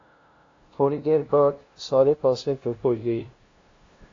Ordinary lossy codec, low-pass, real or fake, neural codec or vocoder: AAC, 32 kbps; 7.2 kHz; fake; codec, 16 kHz, 0.5 kbps, FunCodec, trained on LibriTTS, 25 frames a second